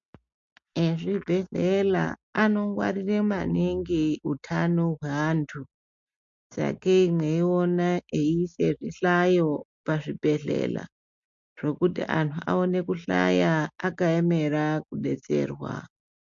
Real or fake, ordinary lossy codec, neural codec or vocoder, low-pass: real; MP3, 48 kbps; none; 7.2 kHz